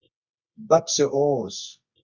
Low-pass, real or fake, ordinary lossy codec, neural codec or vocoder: 7.2 kHz; fake; Opus, 64 kbps; codec, 24 kHz, 0.9 kbps, WavTokenizer, medium music audio release